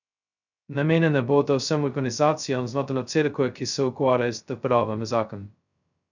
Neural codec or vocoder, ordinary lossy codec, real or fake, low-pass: codec, 16 kHz, 0.2 kbps, FocalCodec; none; fake; 7.2 kHz